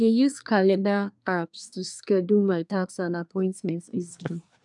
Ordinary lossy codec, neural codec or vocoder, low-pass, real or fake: none; codec, 24 kHz, 1 kbps, SNAC; 10.8 kHz; fake